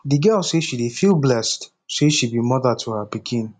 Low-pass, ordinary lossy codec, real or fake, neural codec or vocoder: 9.9 kHz; none; real; none